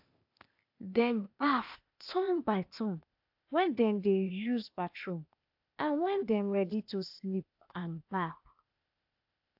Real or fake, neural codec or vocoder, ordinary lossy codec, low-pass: fake; codec, 16 kHz, 0.8 kbps, ZipCodec; AAC, 48 kbps; 5.4 kHz